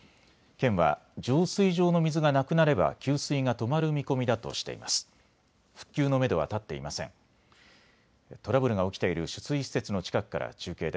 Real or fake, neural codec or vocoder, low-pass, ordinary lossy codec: real; none; none; none